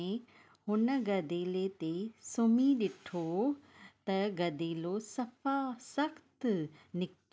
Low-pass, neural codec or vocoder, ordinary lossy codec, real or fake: none; none; none; real